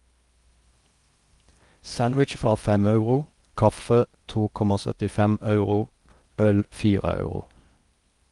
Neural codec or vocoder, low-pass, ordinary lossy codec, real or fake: codec, 16 kHz in and 24 kHz out, 0.8 kbps, FocalCodec, streaming, 65536 codes; 10.8 kHz; Opus, 32 kbps; fake